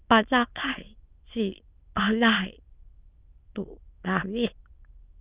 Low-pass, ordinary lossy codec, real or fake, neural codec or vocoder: 3.6 kHz; Opus, 24 kbps; fake; autoencoder, 22.05 kHz, a latent of 192 numbers a frame, VITS, trained on many speakers